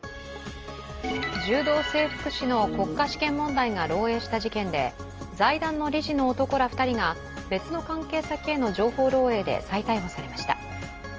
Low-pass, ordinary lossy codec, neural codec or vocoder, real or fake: 7.2 kHz; Opus, 24 kbps; none; real